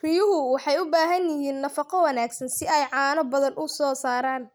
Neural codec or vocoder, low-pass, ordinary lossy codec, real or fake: none; none; none; real